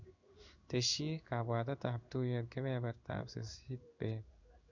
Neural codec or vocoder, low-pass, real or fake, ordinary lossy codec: codec, 16 kHz in and 24 kHz out, 1 kbps, XY-Tokenizer; 7.2 kHz; fake; none